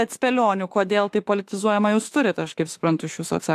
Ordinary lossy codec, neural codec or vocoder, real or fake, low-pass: AAC, 64 kbps; autoencoder, 48 kHz, 32 numbers a frame, DAC-VAE, trained on Japanese speech; fake; 14.4 kHz